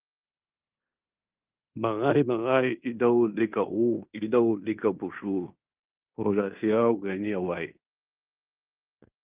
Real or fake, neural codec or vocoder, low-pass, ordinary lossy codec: fake; codec, 16 kHz in and 24 kHz out, 0.9 kbps, LongCat-Audio-Codec, fine tuned four codebook decoder; 3.6 kHz; Opus, 32 kbps